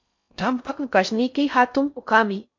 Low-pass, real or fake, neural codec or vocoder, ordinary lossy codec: 7.2 kHz; fake; codec, 16 kHz in and 24 kHz out, 0.6 kbps, FocalCodec, streaming, 4096 codes; MP3, 48 kbps